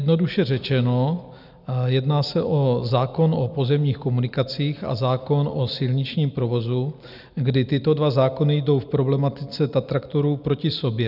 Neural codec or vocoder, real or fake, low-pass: none; real; 5.4 kHz